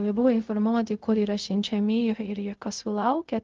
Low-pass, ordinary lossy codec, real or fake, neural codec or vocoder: 7.2 kHz; Opus, 24 kbps; fake; codec, 16 kHz, 0.4 kbps, LongCat-Audio-Codec